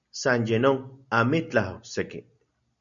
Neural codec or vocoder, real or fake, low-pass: none; real; 7.2 kHz